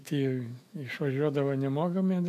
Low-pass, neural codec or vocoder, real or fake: 14.4 kHz; autoencoder, 48 kHz, 128 numbers a frame, DAC-VAE, trained on Japanese speech; fake